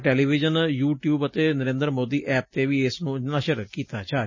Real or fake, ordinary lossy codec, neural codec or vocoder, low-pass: real; MP3, 32 kbps; none; 7.2 kHz